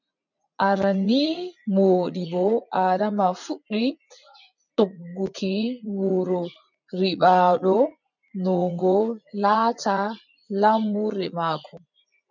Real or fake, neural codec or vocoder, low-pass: fake; vocoder, 44.1 kHz, 80 mel bands, Vocos; 7.2 kHz